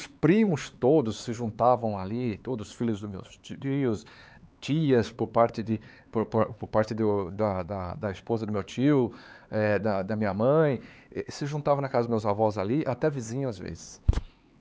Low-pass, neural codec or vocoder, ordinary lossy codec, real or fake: none; codec, 16 kHz, 4 kbps, X-Codec, HuBERT features, trained on LibriSpeech; none; fake